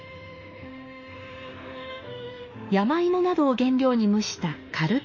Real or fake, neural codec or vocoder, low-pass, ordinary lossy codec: fake; autoencoder, 48 kHz, 32 numbers a frame, DAC-VAE, trained on Japanese speech; 7.2 kHz; MP3, 32 kbps